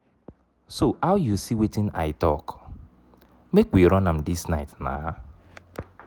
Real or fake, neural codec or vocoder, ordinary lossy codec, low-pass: real; none; none; none